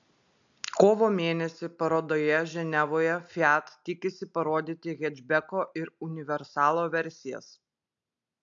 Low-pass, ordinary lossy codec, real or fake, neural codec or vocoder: 7.2 kHz; AAC, 64 kbps; real; none